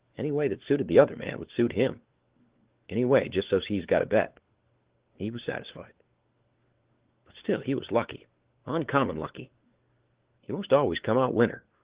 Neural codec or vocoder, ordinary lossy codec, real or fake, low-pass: codec, 16 kHz, 4 kbps, FunCodec, trained on LibriTTS, 50 frames a second; Opus, 16 kbps; fake; 3.6 kHz